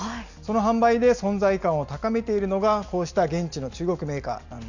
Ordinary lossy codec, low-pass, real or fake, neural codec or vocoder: none; 7.2 kHz; real; none